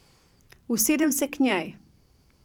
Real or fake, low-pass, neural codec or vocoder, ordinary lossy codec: fake; 19.8 kHz; vocoder, 44.1 kHz, 128 mel bands every 512 samples, BigVGAN v2; none